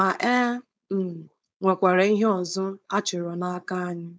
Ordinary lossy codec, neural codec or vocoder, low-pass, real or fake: none; codec, 16 kHz, 4.8 kbps, FACodec; none; fake